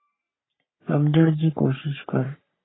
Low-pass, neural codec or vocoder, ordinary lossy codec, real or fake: 7.2 kHz; codec, 44.1 kHz, 3.4 kbps, Pupu-Codec; AAC, 16 kbps; fake